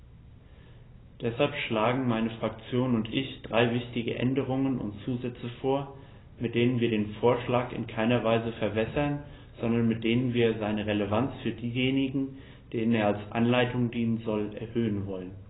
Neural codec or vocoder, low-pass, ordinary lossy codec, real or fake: none; 7.2 kHz; AAC, 16 kbps; real